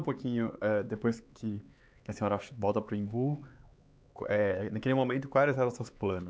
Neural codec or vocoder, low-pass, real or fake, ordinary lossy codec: codec, 16 kHz, 4 kbps, X-Codec, HuBERT features, trained on LibriSpeech; none; fake; none